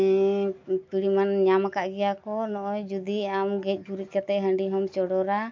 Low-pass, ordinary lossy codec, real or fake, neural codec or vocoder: 7.2 kHz; MP3, 48 kbps; real; none